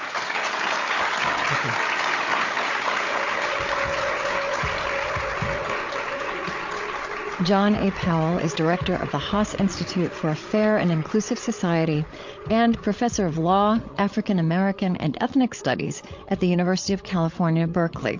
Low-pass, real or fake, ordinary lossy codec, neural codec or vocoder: 7.2 kHz; fake; MP3, 48 kbps; codec, 16 kHz, 8 kbps, FreqCodec, larger model